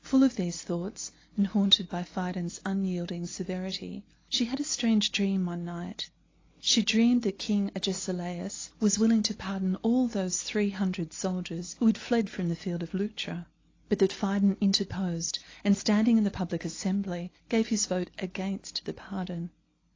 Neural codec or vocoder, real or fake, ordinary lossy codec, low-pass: none; real; AAC, 32 kbps; 7.2 kHz